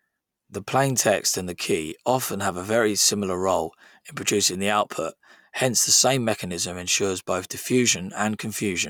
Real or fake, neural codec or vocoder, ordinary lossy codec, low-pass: real; none; none; none